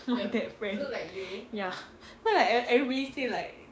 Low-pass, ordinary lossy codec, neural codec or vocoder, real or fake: none; none; codec, 16 kHz, 6 kbps, DAC; fake